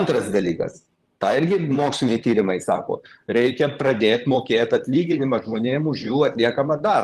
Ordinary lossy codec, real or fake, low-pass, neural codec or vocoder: Opus, 24 kbps; fake; 14.4 kHz; vocoder, 44.1 kHz, 128 mel bands, Pupu-Vocoder